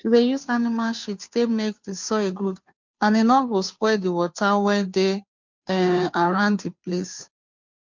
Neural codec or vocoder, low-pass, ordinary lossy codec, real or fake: codec, 16 kHz, 2 kbps, FunCodec, trained on Chinese and English, 25 frames a second; 7.2 kHz; MP3, 64 kbps; fake